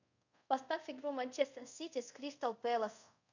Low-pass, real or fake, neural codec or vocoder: 7.2 kHz; fake; codec, 24 kHz, 0.5 kbps, DualCodec